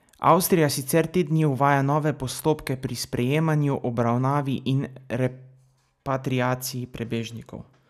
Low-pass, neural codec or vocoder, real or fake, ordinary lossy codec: 14.4 kHz; none; real; none